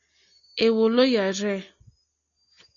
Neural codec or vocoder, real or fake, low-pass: none; real; 7.2 kHz